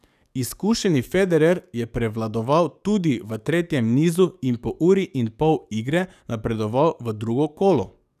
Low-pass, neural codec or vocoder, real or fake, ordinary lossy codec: 14.4 kHz; codec, 44.1 kHz, 7.8 kbps, DAC; fake; none